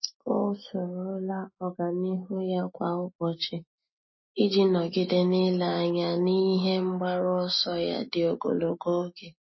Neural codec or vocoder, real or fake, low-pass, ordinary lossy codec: none; real; 7.2 kHz; MP3, 24 kbps